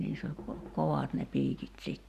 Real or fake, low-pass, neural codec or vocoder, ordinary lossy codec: real; 14.4 kHz; none; Opus, 64 kbps